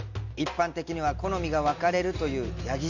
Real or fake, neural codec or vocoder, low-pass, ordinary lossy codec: real; none; 7.2 kHz; none